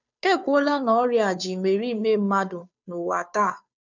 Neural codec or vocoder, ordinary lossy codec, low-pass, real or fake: codec, 16 kHz, 2 kbps, FunCodec, trained on Chinese and English, 25 frames a second; none; 7.2 kHz; fake